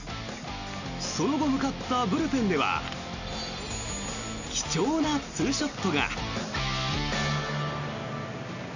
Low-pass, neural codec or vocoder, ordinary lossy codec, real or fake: 7.2 kHz; none; none; real